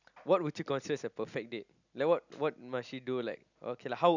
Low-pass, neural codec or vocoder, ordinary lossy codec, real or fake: 7.2 kHz; none; none; real